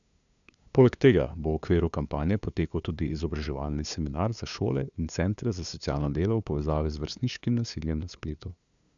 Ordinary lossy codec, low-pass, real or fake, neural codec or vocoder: MP3, 96 kbps; 7.2 kHz; fake; codec, 16 kHz, 2 kbps, FunCodec, trained on LibriTTS, 25 frames a second